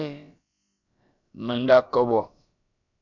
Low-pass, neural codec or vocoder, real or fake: 7.2 kHz; codec, 16 kHz, about 1 kbps, DyCAST, with the encoder's durations; fake